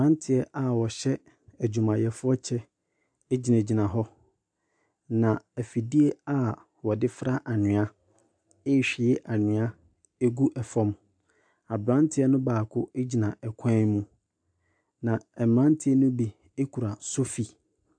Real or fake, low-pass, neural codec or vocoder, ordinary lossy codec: real; 9.9 kHz; none; AAC, 64 kbps